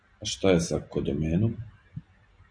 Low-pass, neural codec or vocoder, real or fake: 9.9 kHz; none; real